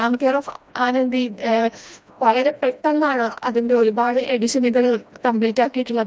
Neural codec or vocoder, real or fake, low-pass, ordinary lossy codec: codec, 16 kHz, 1 kbps, FreqCodec, smaller model; fake; none; none